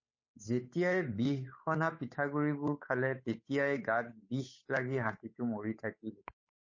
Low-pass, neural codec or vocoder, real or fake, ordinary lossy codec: 7.2 kHz; codec, 16 kHz, 8 kbps, FunCodec, trained on Chinese and English, 25 frames a second; fake; MP3, 32 kbps